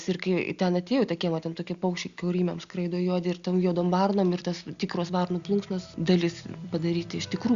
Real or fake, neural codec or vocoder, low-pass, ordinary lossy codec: real; none; 7.2 kHz; Opus, 64 kbps